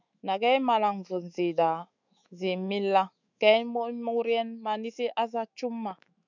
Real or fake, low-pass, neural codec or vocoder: fake; 7.2 kHz; autoencoder, 48 kHz, 128 numbers a frame, DAC-VAE, trained on Japanese speech